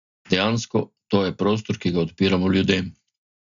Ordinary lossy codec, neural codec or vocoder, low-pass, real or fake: none; none; 7.2 kHz; real